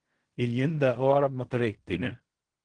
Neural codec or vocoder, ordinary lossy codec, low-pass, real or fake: codec, 16 kHz in and 24 kHz out, 0.4 kbps, LongCat-Audio-Codec, fine tuned four codebook decoder; Opus, 16 kbps; 9.9 kHz; fake